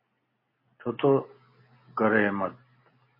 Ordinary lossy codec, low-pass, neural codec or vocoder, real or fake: MP3, 24 kbps; 5.4 kHz; none; real